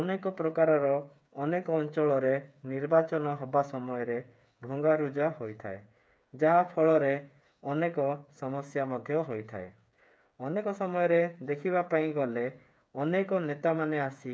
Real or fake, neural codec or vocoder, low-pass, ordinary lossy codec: fake; codec, 16 kHz, 8 kbps, FreqCodec, smaller model; none; none